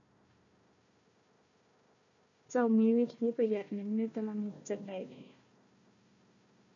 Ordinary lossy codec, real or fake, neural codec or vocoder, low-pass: none; fake; codec, 16 kHz, 1 kbps, FunCodec, trained on Chinese and English, 50 frames a second; 7.2 kHz